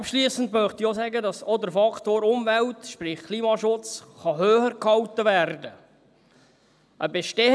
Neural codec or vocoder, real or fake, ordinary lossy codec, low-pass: none; real; none; none